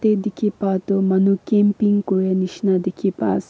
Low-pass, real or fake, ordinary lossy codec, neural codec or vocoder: none; real; none; none